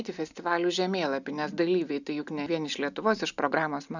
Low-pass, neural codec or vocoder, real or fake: 7.2 kHz; none; real